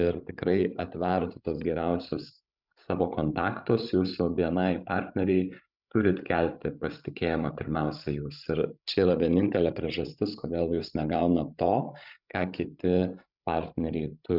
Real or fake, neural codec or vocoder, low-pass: fake; codec, 16 kHz, 16 kbps, FreqCodec, larger model; 5.4 kHz